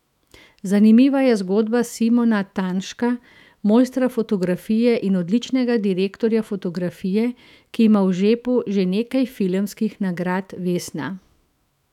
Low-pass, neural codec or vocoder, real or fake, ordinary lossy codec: 19.8 kHz; autoencoder, 48 kHz, 128 numbers a frame, DAC-VAE, trained on Japanese speech; fake; none